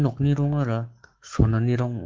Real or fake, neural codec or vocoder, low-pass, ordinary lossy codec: fake; codec, 44.1 kHz, 7.8 kbps, Pupu-Codec; 7.2 kHz; Opus, 16 kbps